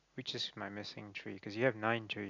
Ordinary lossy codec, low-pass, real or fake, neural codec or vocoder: none; 7.2 kHz; real; none